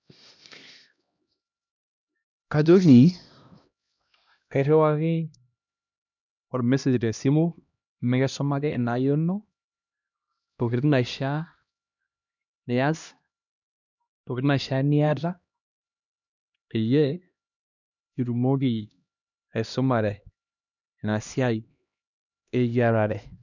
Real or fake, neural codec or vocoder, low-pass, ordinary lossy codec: fake; codec, 16 kHz, 1 kbps, X-Codec, HuBERT features, trained on LibriSpeech; 7.2 kHz; none